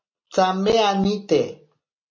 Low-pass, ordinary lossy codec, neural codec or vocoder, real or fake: 7.2 kHz; MP3, 32 kbps; none; real